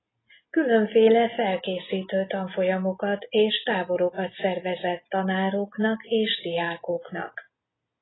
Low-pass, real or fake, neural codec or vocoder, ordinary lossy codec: 7.2 kHz; real; none; AAC, 16 kbps